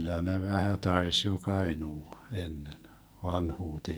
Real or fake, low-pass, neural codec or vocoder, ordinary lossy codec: fake; none; codec, 44.1 kHz, 2.6 kbps, SNAC; none